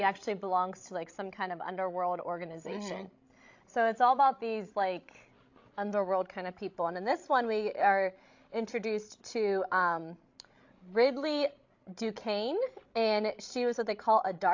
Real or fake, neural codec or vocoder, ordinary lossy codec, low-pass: fake; codec, 16 kHz, 16 kbps, FreqCodec, larger model; AAC, 48 kbps; 7.2 kHz